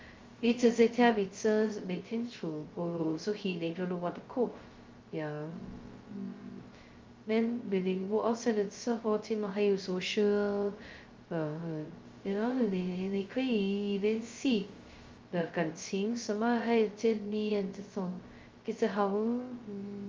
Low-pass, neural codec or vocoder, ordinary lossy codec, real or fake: 7.2 kHz; codec, 16 kHz, 0.2 kbps, FocalCodec; Opus, 32 kbps; fake